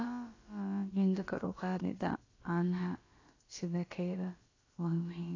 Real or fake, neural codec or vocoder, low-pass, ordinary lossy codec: fake; codec, 16 kHz, about 1 kbps, DyCAST, with the encoder's durations; 7.2 kHz; AAC, 32 kbps